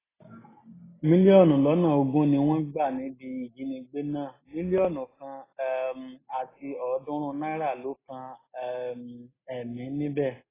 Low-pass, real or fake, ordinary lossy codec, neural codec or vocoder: 3.6 kHz; real; AAC, 16 kbps; none